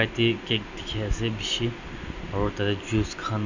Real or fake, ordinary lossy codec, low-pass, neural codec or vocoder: real; none; 7.2 kHz; none